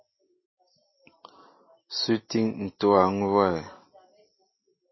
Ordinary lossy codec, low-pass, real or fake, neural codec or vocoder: MP3, 24 kbps; 7.2 kHz; real; none